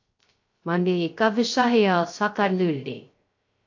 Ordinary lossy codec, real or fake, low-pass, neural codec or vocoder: AAC, 48 kbps; fake; 7.2 kHz; codec, 16 kHz, 0.3 kbps, FocalCodec